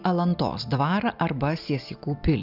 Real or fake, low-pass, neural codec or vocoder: real; 5.4 kHz; none